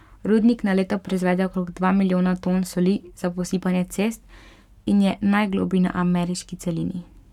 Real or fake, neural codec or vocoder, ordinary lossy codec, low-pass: fake; codec, 44.1 kHz, 7.8 kbps, Pupu-Codec; none; 19.8 kHz